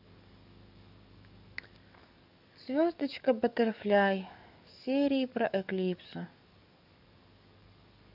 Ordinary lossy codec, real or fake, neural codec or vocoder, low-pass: none; fake; codec, 44.1 kHz, 7.8 kbps, DAC; 5.4 kHz